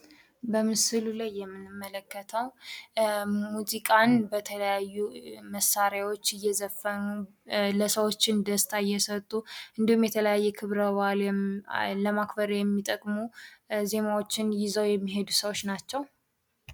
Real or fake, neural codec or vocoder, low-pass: real; none; 19.8 kHz